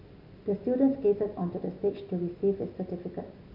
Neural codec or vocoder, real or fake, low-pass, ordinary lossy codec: none; real; 5.4 kHz; none